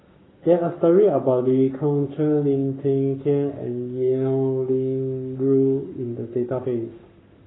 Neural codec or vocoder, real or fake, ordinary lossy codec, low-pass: codec, 44.1 kHz, 7.8 kbps, Pupu-Codec; fake; AAC, 16 kbps; 7.2 kHz